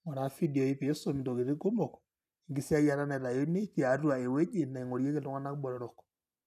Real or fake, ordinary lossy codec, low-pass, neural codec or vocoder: real; none; 14.4 kHz; none